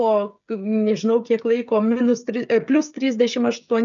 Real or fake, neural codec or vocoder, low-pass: fake; codec, 16 kHz, 16 kbps, FreqCodec, smaller model; 7.2 kHz